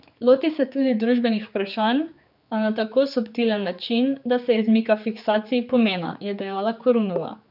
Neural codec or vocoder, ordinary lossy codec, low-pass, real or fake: codec, 16 kHz, 4 kbps, X-Codec, HuBERT features, trained on general audio; none; 5.4 kHz; fake